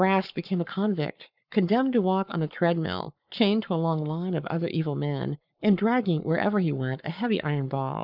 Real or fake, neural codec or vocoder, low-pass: fake; codec, 44.1 kHz, 7.8 kbps, Pupu-Codec; 5.4 kHz